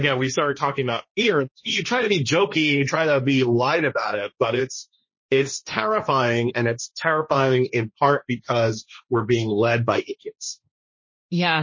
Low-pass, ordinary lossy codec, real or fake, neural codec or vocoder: 7.2 kHz; MP3, 32 kbps; fake; codec, 16 kHz, 1.1 kbps, Voila-Tokenizer